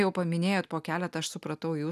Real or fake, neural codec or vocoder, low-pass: real; none; 14.4 kHz